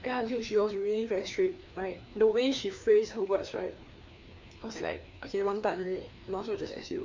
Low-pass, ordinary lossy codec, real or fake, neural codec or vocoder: 7.2 kHz; MP3, 48 kbps; fake; codec, 16 kHz, 2 kbps, FreqCodec, larger model